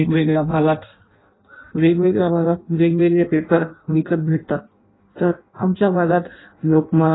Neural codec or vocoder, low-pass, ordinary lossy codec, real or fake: codec, 16 kHz in and 24 kHz out, 0.6 kbps, FireRedTTS-2 codec; 7.2 kHz; AAC, 16 kbps; fake